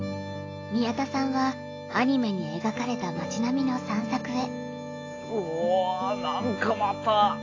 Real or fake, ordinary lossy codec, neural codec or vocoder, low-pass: real; AAC, 32 kbps; none; 7.2 kHz